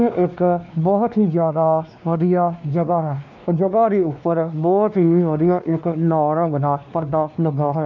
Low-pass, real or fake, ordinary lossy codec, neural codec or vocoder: 7.2 kHz; fake; MP3, 64 kbps; codec, 16 kHz, 2 kbps, X-Codec, WavLM features, trained on Multilingual LibriSpeech